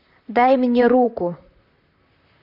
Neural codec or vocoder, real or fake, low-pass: vocoder, 44.1 kHz, 128 mel bands, Pupu-Vocoder; fake; 5.4 kHz